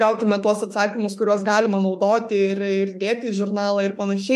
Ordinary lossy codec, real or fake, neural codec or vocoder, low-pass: MP3, 64 kbps; fake; autoencoder, 48 kHz, 32 numbers a frame, DAC-VAE, trained on Japanese speech; 14.4 kHz